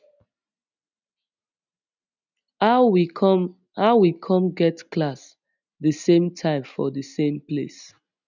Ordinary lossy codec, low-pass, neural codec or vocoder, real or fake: none; 7.2 kHz; none; real